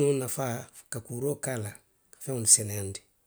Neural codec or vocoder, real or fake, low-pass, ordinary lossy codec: none; real; none; none